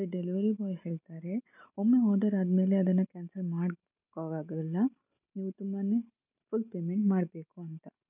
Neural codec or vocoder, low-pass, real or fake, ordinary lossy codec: none; 3.6 kHz; real; none